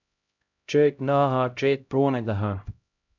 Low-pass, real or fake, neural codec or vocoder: 7.2 kHz; fake; codec, 16 kHz, 0.5 kbps, X-Codec, HuBERT features, trained on LibriSpeech